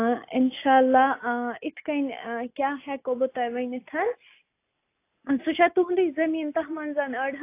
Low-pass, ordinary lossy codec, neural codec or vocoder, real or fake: 3.6 kHz; AAC, 24 kbps; none; real